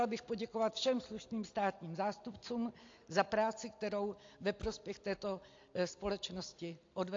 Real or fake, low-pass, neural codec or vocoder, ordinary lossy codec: real; 7.2 kHz; none; AAC, 48 kbps